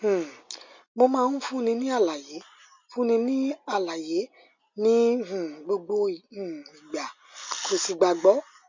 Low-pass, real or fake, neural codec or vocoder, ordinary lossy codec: 7.2 kHz; real; none; MP3, 64 kbps